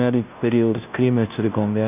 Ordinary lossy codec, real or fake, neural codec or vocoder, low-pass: none; fake; codec, 16 kHz, 0.5 kbps, FunCodec, trained on Chinese and English, 25 frames a second; 3.6 kHz